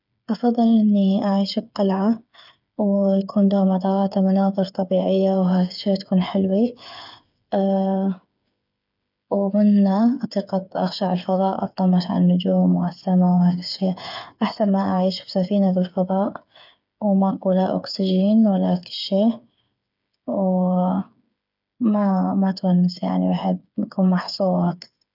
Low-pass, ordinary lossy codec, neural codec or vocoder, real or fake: 5.4 kHz; none; codec, 16 kHz, 8 kbps, FreqCodec, smaller model; fake